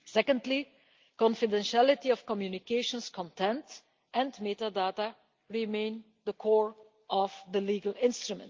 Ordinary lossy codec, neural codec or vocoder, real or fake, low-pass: Opus, 32 kbps; none; real; 7.2 kHz